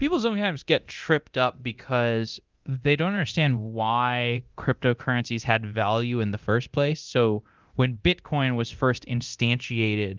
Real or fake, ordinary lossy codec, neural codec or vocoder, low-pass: fake; Opus, 32 kbps; codec, 24 kHz, 0.9 kbps, DualCodec; 7.2 kHz